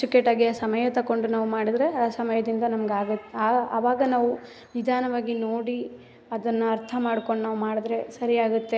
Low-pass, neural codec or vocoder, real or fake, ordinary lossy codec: none; none; real; none